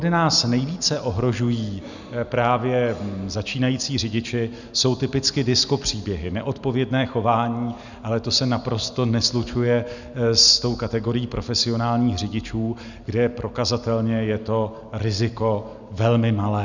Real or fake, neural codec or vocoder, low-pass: real; none; 7.2 kHz